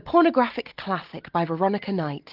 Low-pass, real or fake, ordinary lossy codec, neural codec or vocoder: 5.4 kHz; real; Opus, 24 kbps; none